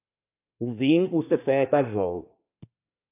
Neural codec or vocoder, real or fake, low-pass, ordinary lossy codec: codec, 24 kHz, 1 kbps, SNAC; fake; 3.6 kHz; AAC, 32 kbps